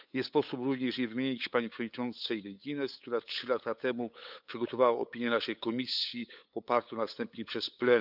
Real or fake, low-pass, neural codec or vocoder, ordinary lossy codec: fake; 5.4 kHz; codec, 16 kHz, 8 kbps, FunCodec, trained on LibriTTS, 25 frames a second; none